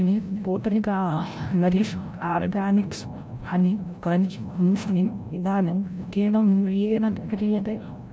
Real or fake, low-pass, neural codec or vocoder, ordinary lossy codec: fake; none; codec, 16 kHz, 0.5 kbps, FreqCodec, larger model; none